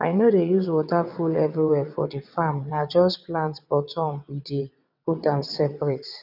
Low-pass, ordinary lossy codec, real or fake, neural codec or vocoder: 5.4 kHz; none; fake; vocoder, 22.05 kHz, 80 mel bands, WaveNeXt